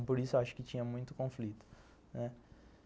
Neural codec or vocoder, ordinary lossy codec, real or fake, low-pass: none; none; real; none